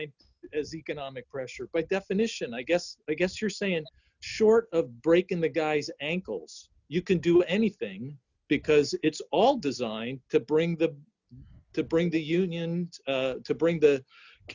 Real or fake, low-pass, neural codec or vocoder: real; 7.2 kHz; none